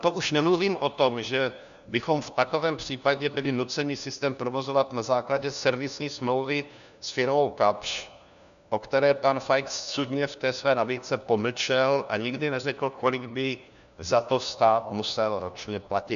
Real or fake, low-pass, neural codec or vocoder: fake; 7.2 kHz; codec, 16 kHz, 1 kbps, FunCodec, trained on LibriTTS, 50 frames a second